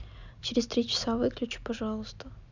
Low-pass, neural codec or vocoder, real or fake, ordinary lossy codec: 7.2 kHz; none; real; none